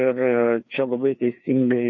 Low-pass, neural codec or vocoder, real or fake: 7.2 kHz; codec, 16 kHz, 2 kbps, FreqCodec, larger model; fake